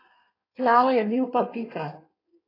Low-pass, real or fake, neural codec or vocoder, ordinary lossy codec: 5.4 kHz; fake; codec, 16 kHz in and 24 kHz out, 1.1 kbps, FireRedTTS-2 codec; AAC, 24 kbps